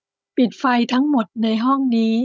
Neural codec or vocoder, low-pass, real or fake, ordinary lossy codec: codec, 16 kHz, 16 kbps, FunCodec, trained on Chinese and English, 50 frames a second; none; fake; none